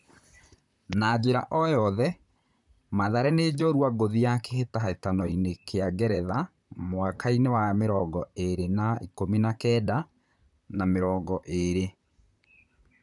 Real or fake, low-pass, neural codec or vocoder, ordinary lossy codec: fake; 10.8 kHz; vocoder, 44.1 kHz, 128 mel bands, Pupu-Vocoder; none